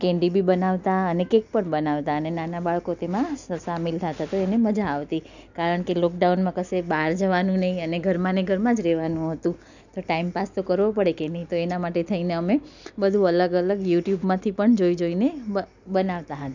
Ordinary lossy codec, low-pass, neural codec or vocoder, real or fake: none; 7.2 kHz; none; real